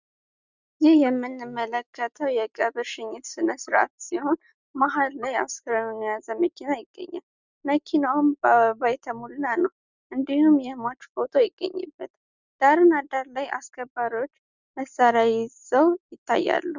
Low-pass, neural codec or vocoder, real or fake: 7.2 kHz; none; real